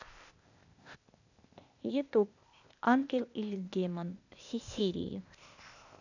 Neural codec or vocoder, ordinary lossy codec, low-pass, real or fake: codec, 16 kHz, 0.8 kbps, ZipCodec; none; 7.2 kHz; fake